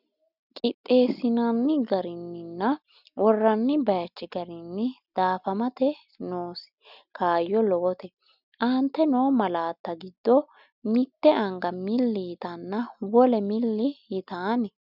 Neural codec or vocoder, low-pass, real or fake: none; 5.4 kHz; real